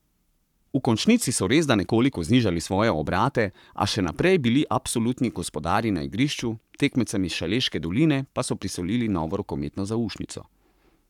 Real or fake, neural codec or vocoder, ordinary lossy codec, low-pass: fake; codec, 44.1 kHz, 7.8 kbps, Pupu-Codec; none; 19.8 kHz